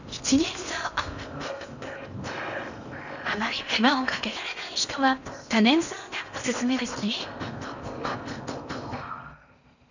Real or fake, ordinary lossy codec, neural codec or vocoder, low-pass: fake; none; codec, 16 kHz in and 24 kHz out, 0.8 kbps, FocalCodec, streaming, 65536 codes; 7.2 kHz